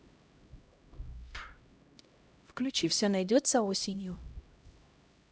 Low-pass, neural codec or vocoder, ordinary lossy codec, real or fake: none; codec, 16 kHz, 0.5 kbps, X-Codec, HuBERT features, trained on LibriSpeech; none; fake